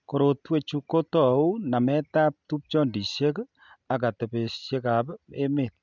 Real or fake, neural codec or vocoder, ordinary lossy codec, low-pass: real; none; none; 7.2 kHz